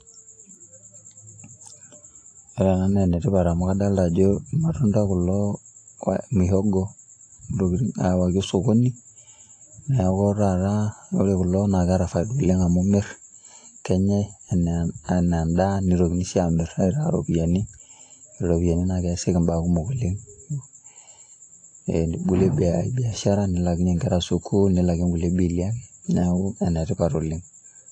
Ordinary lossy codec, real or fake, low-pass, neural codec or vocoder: AAC, 48 kbps; real; 9.9 kHz; none